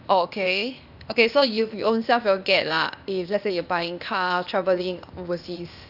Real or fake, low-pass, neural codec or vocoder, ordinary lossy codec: fake; 5.4 kHz; codec, 16 kHz, 0.8 kbps, ZipCodec; none